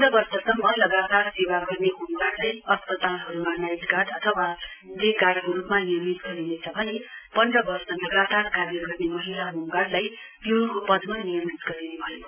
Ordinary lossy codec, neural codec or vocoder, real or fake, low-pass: none; none; real; 3.6 kHz